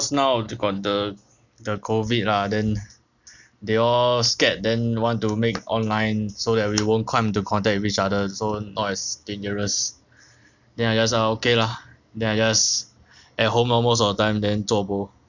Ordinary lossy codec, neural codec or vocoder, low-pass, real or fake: none; none; 7.2 kHz; real